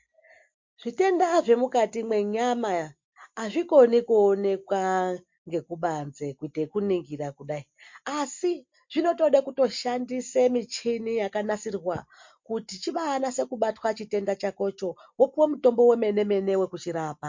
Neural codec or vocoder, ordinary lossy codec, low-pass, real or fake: none; MP3, 48 kbps; 7.2 kHz; real